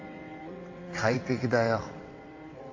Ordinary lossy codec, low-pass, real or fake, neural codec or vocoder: AAC, 32 kbps; 7.2 kHz; fake; vocoder, 22.05 kHz, 80 mel bands, WaveNeXt